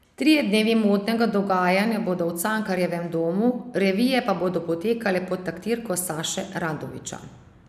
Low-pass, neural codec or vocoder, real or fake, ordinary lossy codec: 14.4 kHz; none; real; none